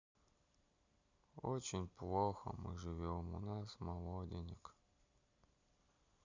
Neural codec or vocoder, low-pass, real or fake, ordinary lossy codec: none; 7.2 kHz; real; Opus, 64 kbps